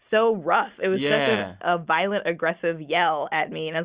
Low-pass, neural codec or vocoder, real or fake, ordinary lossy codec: 3.6 kHz; none; real; Opus, 64 kbps